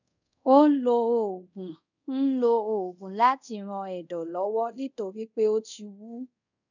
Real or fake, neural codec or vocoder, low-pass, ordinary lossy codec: fake; codec, 24 kHz, 0.5 kbps, DualCodec; 7.2 kHz; none